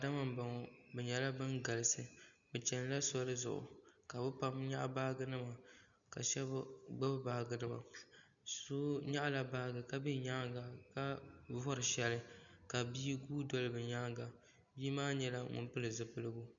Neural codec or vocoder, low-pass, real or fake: none; 7.2 kHz; real